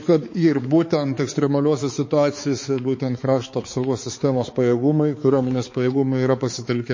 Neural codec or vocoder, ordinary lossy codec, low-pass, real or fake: codec, 16 kHz, 4 kbps, X-Codec, HuBERT features, trained on balanced general audio; MP3, 32 kbps; 7.2 kHz; fake